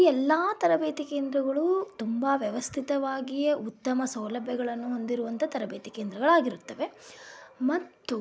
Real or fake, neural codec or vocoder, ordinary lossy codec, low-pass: real; none; none; none